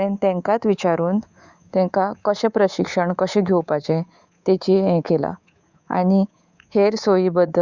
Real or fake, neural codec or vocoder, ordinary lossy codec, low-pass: fake; codec, 24 kHz, 3.1 kbps, DualCodec; Opus, 64 kbps; 7.2 kHz